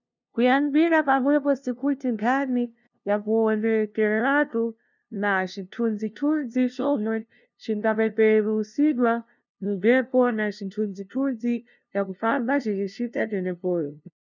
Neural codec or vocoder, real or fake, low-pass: codec, 16 kHz, 0.5 kbps, FunCodec, trained on LibriTTS, 25 frames a second; fake; 7.2 kHz